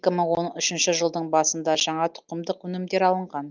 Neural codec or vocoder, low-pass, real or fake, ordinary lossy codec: none; 7.2 kHz; real; Opus, 32 kbps